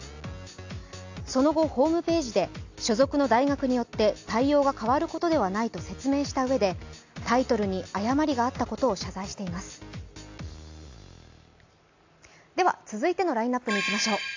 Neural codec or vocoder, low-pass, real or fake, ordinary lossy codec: none; 7.2 kHz; real; AAC, 48 kbps